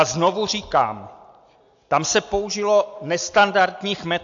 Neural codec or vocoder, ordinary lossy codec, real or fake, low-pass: none; AAC, 64 kbps; real; 7.2 kHz